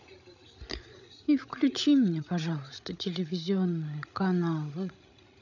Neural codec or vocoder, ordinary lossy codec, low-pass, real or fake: codec, 16 kHz, 16 kbps, FreqCodec, larger model; none; 7.2 kHz; fake